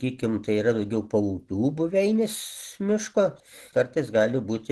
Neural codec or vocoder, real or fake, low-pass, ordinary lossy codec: none; real; 10.8 kHz; Opus, 16 kbps